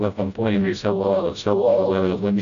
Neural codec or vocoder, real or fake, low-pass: codec, 16 kHz, 0.5 kbps, FreqCodec, smaller model; fake; 7.2 kHz